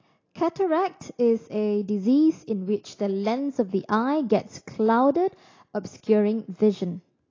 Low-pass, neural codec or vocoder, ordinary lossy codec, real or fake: 7.2 kHz; none; AAC, 32 kbps; real